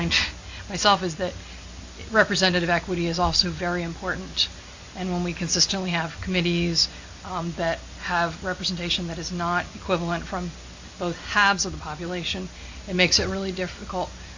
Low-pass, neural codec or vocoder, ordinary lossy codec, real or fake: 7.2 kHz; none; AAC, 48 kbps; real